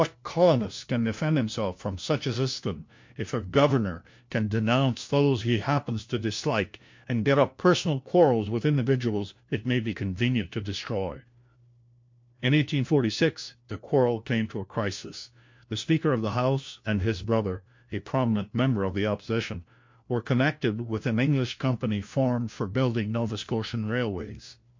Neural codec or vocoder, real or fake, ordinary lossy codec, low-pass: codec, 16 kHz, 1 kbps, FunCodec, trained on LibriTTS, 50 frames a second; fake; MP3, 48 kbps; 7.2 kHz